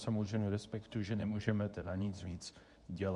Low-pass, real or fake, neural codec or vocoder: 10.8 kHz; fake; codec, 24 kHz, 0.9 kbps, WavTokenizer, medium speech release version 2